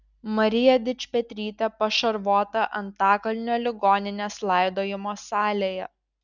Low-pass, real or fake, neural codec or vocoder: 7.2 kHz; real; none